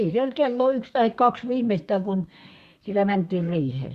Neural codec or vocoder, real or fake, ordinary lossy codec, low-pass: codec, 32 kHz, 1.9 kbps, SNAC; fake; Opus, 64 kbps; 14.4 kHz